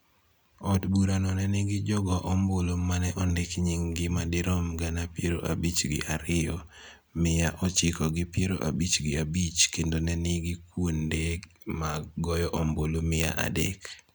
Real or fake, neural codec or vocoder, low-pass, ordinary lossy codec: real; none; none; none